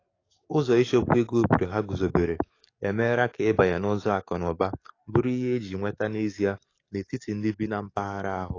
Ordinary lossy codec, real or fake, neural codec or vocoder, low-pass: AAC, 32 kbps; fake; codec, 44.1 kHz, 7.8 kbps, DAC; 7.2 kHz